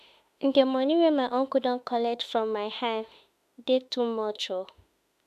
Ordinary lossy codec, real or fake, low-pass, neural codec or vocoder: none; fake; 14.4 kHz; autoencoder, 48 kHz, 32 numbers a frame, DAC-VAE, trained on Japanese speech